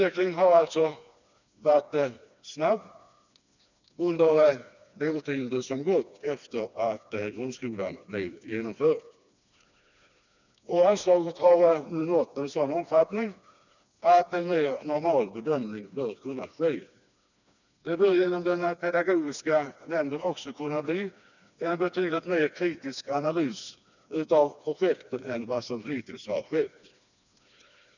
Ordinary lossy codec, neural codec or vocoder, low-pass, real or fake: none; codec, 16 kHz, 2 kbps, FreqCodec, smaller model; 7.2 kHz; fake